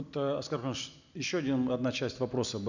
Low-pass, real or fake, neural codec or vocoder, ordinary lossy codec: 7.2 kHz; real; none; none